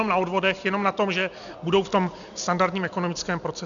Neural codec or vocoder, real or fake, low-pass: none; real; 7.2 kHz